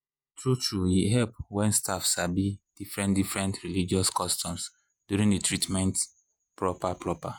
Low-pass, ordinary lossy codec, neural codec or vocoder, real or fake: none; none; vocoder, 48 kHz, 128 mel bands, Vocos; fake